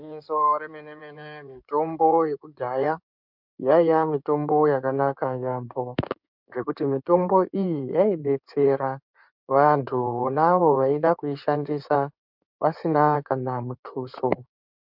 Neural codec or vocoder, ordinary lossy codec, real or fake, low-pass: codec, 16 kHz in and 24 kHz out, 2.2 kbps, FireRedTTS-2 codec; MP3, 48 kbps; fake; 5.4 kHz